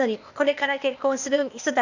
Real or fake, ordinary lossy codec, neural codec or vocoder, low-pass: fake; none; codec, 16 kHz, 0.8 kbps, ZipCodec; 7.2 kHz